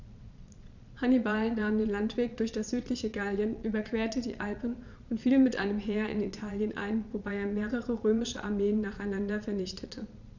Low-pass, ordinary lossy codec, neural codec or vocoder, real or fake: 7.2 kHz; none; vocoder, 22.05 kHz, 80 mel bands, Vocos; fake